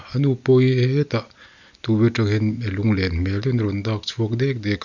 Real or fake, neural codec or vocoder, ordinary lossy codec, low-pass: real; none; none; 7.2 kHz